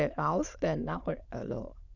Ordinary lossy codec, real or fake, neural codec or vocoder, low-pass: none; fake; autoencoder, 22.05 kHz, a latent of 192 numbers a frame, VITS, trained on many speakers; 7.2 kHz